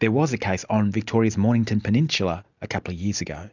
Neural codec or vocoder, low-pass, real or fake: none; 7.2 kHz; real